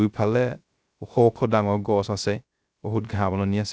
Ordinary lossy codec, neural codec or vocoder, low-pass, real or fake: none; codec, 16 kHz, 0.3 kbps, FocalCodec; none; fake